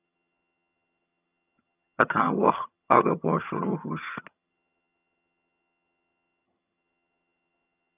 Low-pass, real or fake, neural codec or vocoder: 3.6 kHz; fake; vocoder, 22.05 kHz, 80 mel bands, HiFi-GAN